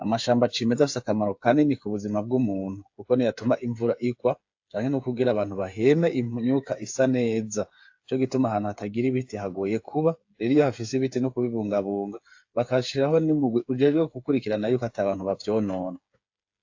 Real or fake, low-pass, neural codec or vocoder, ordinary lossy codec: fake; 7.2 kHz; codec, 16 kHz, 8 kbps, FreqCodec, smaller model; AAC, 48 kbps